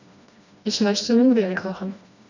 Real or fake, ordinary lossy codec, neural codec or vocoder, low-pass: fake; none; codec, 16 kHz, 1 kbps, FreqCodec, smaller model; 7.2 kHz